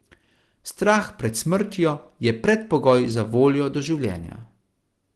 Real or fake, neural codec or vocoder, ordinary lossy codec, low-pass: real; none; Opus, 16 kbps; 10.8 kHz